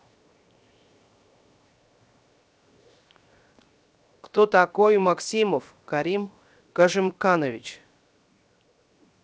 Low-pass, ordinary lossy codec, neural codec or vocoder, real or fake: none; none; codec, 16 kHz, 0.7 kbps, FocalCodec; fake